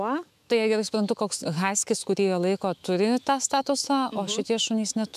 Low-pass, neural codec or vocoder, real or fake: 14.4 kHz; autoencoder, 48 kHz, 128 numbers a frame, DAC-VAE, trained on Japanese speech; fake